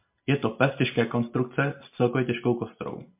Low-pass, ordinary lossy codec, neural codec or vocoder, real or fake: 3.6 kHz; MP3, 32 kbps; none; real